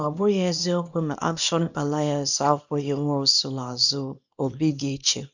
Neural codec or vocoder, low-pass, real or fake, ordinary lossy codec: codec, 24 kHz, 0.9 kbps, WavTokenizer, small release; 7.2 kHz; fake; none